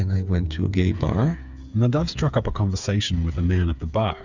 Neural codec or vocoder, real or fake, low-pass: codec, 16 kHz, 8 kbps, FreqCodec, smaller model; fake; 7.2 kHz